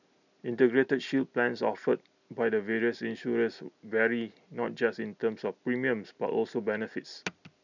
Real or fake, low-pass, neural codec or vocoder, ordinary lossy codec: real; 7.2 kHz; none; none